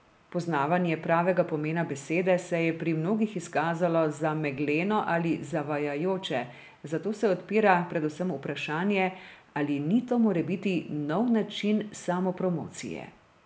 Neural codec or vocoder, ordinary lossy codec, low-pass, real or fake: none; none; none; real